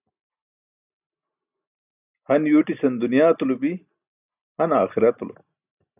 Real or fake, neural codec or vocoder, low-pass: real; none; 3.6 kHz